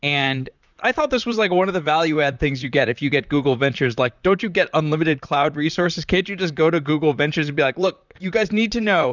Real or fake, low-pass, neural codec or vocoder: fake; 7.2 kHz; vocoder, 44.1 kHz, 128 mel bands, Pupu-Vocoder